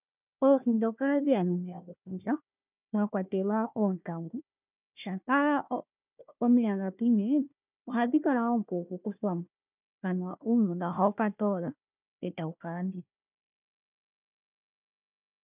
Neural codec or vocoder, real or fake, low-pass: codec, 16 kHz, 1 kbps, FunCodec, trained on Chinese and English, 50 frames a second; fake; 3.6 kHz